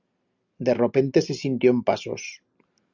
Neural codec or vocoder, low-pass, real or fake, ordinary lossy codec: none; 7.2 kHz; real; Opus, 64 kbps